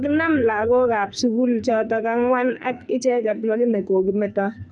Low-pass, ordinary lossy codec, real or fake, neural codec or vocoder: 10.8 kHz; none; fake; codec, 44.1 kHz, 2.6 kbps, SNAC